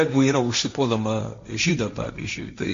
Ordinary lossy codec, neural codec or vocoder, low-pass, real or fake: MP3, 48 kbps; codec, 16 kHz, 1.1 kbps, Voila-Tokenizer; 7.2 kHz; fake